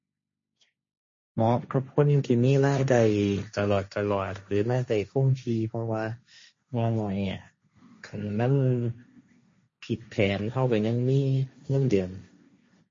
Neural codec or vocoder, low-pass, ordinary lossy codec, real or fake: codec, 16 kHz, 1.1 kbps, Voila-Tokenizer; 7.2 kHz; MP3, 32 kbps; fake